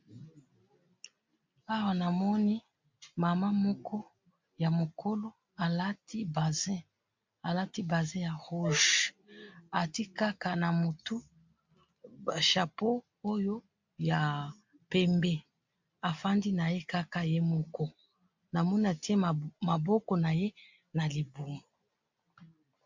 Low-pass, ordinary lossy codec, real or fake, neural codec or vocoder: 7.2 kHz; MP3, 64 kbps; real; none